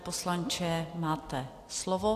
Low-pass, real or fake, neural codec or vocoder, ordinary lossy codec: 14.4 kHz; real; none; AAC, 64 kbps